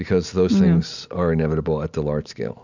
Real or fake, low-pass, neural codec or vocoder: real; 7.2 kHz; none